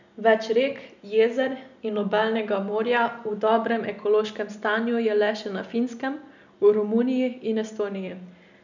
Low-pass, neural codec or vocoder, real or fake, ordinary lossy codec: 7.2 kHz; none; real; none